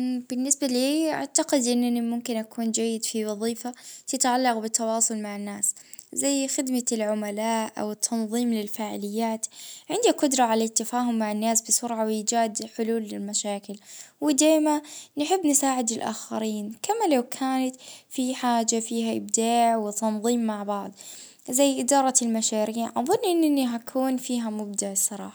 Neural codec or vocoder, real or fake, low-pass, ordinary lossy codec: none; real; none; none